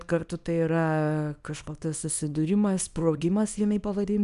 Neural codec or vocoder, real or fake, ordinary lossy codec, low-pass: codec, 24 kHz, 0.9 kbps, WavTokenizer, small release; fake; Opus, 64 kbps; 10.8 kHz